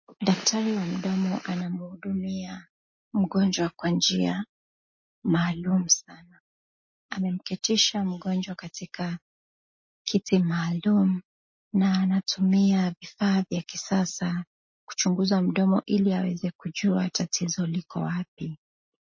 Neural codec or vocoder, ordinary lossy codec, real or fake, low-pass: none; MP3, 32 kbps; real; 7.2 kHz